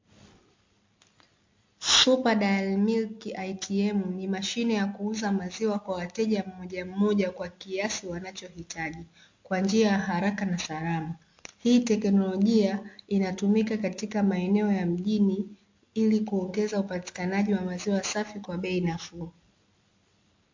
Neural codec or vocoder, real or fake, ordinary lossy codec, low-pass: none; real; MP3, 48 kbps; 7.2 kHz